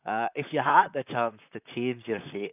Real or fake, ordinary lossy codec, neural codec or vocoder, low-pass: fake; none; vocoder, 44.1 kHz, 128 mel bands, Pupu-Vocoder; 3.6 kHz